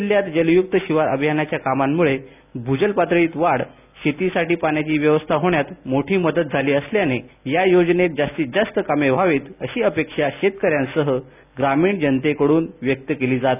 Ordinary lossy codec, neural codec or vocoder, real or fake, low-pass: none; none; real; 3.6 kHz